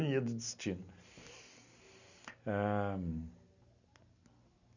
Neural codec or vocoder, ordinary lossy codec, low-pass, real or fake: none; none; 7.2 kHz; real